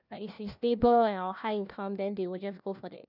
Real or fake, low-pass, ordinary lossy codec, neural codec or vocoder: fake; 5.4 kHz; none; codec, 16 kHz, 1 kbps, FunCodec, trained on LibriTTS, 50 frames a second